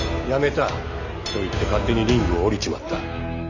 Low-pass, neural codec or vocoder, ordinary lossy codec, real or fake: 7.2 kHz; none; none; real